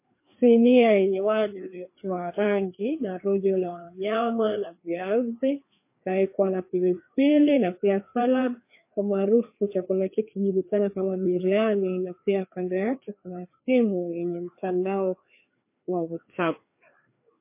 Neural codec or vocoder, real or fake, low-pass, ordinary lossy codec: codec, 16 kHz, 2 kbps, FreqCodec, larger model; fake; 3.6 kHz; MP3, 24 kbps